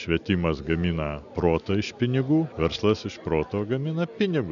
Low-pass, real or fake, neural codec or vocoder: 7.2 kHz; real; none